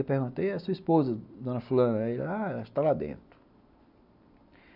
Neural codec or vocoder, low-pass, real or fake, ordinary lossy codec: none; 5.4 kHz; real; none